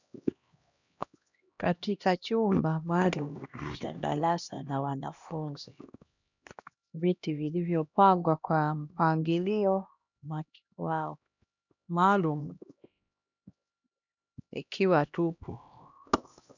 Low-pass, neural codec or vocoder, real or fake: 7.2 kHz; codec, 16 kHz, 1 kbps, X-Codec, HuBERT features, trained on LibriSpeech; fake